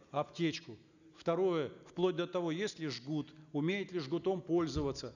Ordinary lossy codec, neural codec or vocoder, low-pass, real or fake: none; none; 7.2 kHz; real